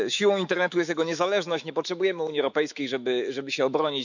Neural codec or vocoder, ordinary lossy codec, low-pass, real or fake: autoencoder, 48 kHz, 128 numbers a frame, DAC-VAE, trained on Japanese speech; none; 7.2 kHz; fake